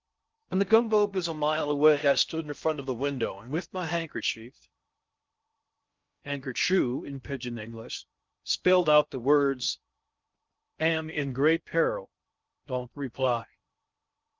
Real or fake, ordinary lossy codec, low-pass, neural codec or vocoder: fake; Opus, 32 kbps; 7.2 kHz; codec, 16 kHz in and 24 kHz out, 0.6 kbps, FocalCodec, streaming, 2048 codes